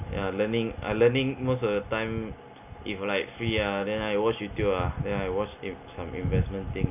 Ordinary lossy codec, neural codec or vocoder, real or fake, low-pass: none; none; real; 3.6 kHz